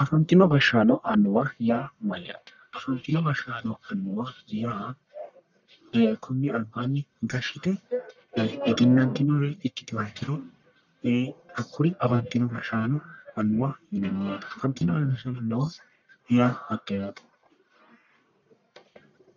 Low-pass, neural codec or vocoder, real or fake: 7.2 kHz; codec, 44.1 kHz, 1.7 kbps, Pupu-Codec; fake